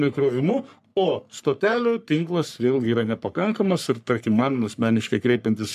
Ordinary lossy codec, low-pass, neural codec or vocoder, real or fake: MP3, 96 kbps; 14.4 kHz; codec, 44.1 kHz, 3.4 kbps, Pupu-Codec; fake